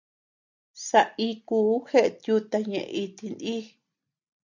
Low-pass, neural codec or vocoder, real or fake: 7.2 kHz; none; real